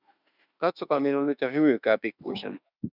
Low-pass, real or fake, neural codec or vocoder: 5.4 kHz; fake; autoencoder, 48 kHz, 32 numbers a frame, DAC-VAE, trained on Japanese speech